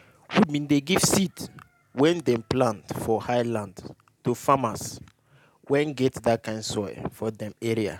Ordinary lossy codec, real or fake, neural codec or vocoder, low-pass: none; real; none; 19.8 kHz